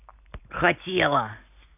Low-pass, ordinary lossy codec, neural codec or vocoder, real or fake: 3.6 kHz; none; none; real